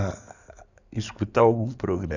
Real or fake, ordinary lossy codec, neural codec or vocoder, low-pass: fake; none; codec, 16 kHz in and 24 kHz out, 2.2 kbps, FireRedTTS-2 codec; 7.2 kHz